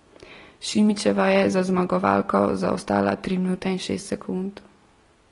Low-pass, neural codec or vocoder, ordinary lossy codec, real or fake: 10.8 kHz; none; AAC, 32 kbps; real